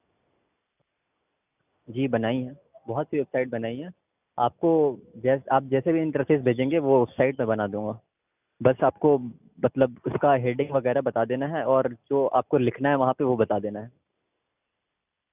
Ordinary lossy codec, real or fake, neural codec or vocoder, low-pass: none; real; none; 3.6 kHz